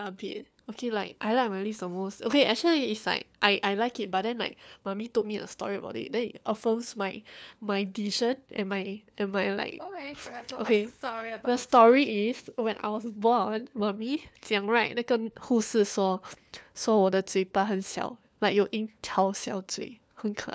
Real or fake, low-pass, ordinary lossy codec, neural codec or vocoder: fake; none; none; codec, 16 kHz, 4 kbps, FunCodec, trained on LibriTTS, 50 frames a second